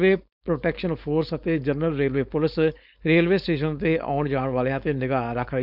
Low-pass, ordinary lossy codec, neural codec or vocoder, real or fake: 5.4 kHz; none; codec, 16 kHz, 4.8 kbps, FACodec; fake